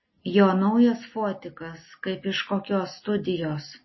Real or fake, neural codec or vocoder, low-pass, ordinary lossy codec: real; none; 7.2 kHz; MP3, 24 kbps